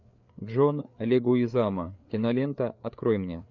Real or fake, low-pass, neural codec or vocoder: fake; 7.2 kHz; codec, 16 kHz, 4 kbps, FreqCodec, larger model